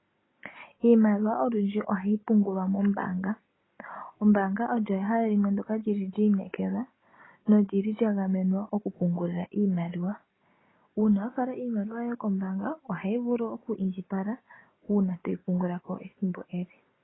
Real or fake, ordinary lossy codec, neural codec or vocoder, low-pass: real; AAC, 16 kbps; none; 7.2 kHz